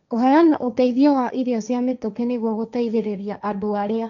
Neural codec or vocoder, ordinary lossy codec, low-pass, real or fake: codec, 16 kHz, 1.1 kbps, Voila-Tokenizer; none; 7.2 kHz; fake